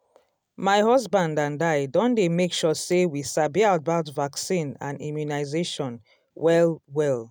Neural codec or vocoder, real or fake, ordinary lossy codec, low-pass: none; real; none; none